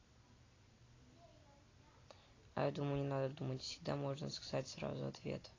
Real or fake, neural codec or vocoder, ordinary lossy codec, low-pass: real; none; AAC, 32 kbps; 7.2 kHz